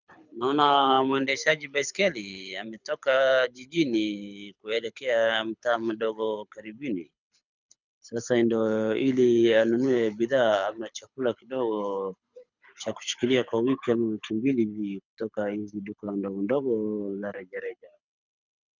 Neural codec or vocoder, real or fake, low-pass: codec, 24 kHz, 6 kbps, HILCodec; fake; 7.2 kHz